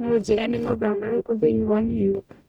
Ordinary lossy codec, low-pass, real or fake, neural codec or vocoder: none; 19.8 kHz; fake; codec, 44.1 kHz, 0.9 kbps, DAC